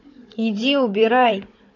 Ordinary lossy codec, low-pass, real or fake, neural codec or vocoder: none; 7.2 kHz; fake; codec, 16 kHz, 16 kbps, FunCodec, trained on Chinese and English, 50 frames a second